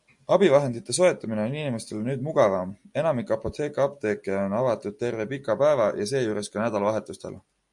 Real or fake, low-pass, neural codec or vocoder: real; 10.8 kHz; none